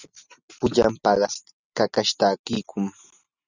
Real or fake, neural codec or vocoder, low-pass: real; none; 7.2 kHz